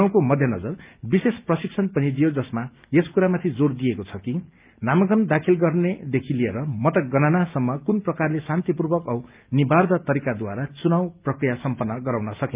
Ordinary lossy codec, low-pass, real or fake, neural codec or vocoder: Opus, 24 kbps; 3.6 kHz; real; none